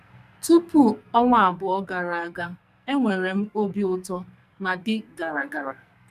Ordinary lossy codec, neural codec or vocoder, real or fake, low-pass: none; codec, 44.1 kHz, 2.6 kbps, SNAC; fake; 14.4 kHz